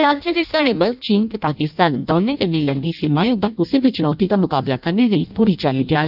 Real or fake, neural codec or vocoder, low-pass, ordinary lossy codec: fake; codec, 16 kHz in and 24 kHz out, 0.6 kbps, FireRedTTS-2 codec; 5.4 kHz; none